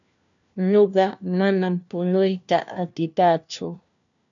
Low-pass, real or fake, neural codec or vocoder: 7.2 kHz; fake; codec, 16 kHz, 1 kbps, FunCodec, trained on LibriTTS, 50 frames a second